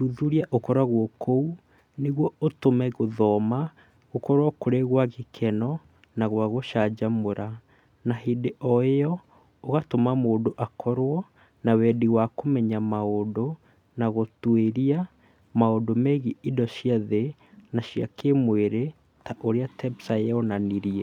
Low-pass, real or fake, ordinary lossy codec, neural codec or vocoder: 19.8 kHz; real; none; none